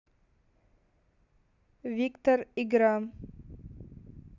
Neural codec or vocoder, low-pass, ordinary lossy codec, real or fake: none; 7.2 kHz; none; real